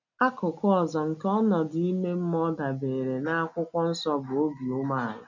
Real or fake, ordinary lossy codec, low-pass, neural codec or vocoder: real; none; 7.2 kHz; none